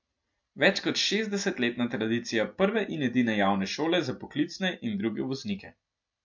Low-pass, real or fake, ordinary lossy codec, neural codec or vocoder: 7.2 kHz; real; MP3, 48 kbps; none